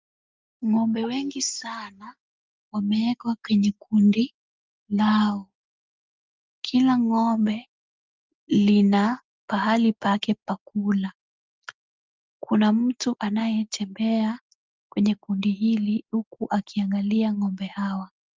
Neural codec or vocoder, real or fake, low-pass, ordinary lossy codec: none; real; 7.2 kHz; Opus, 32 kbps